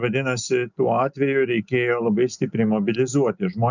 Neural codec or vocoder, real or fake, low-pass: none; real; 7.2 kHz